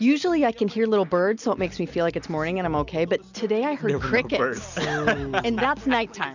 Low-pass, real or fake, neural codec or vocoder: 7.2 kHz; real; none